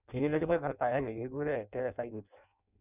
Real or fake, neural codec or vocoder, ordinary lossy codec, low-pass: fake; codec, 16 kHz in and 24 kHz out, 0.6 kbps, FireRedTTS-2 codec; none; 3.6 kHz